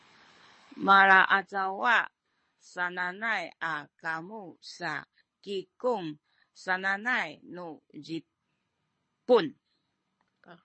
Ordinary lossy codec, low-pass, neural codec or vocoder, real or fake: MP3, 32 kbps; 9.9 kHz; codec, 24 kHz, 6 kbps, HILCodec; fake